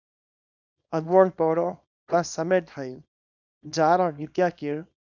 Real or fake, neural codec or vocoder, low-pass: fake; codec, 24 kHz, 0.9 kbps, WavTokenizer, small release; 7.2 kHz